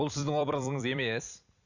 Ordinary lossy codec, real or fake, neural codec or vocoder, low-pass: none; fake; vocoder, 44.1 kHz, 128 mel bands every 256 samples, BigVGAN v2; 7.2 kHz